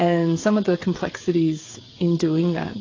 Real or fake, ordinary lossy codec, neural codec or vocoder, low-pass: fake; AAC, 32 kbps; codec, 16 kHz, 16 kbps, FreqCodec, smaller model; 7.2 kHz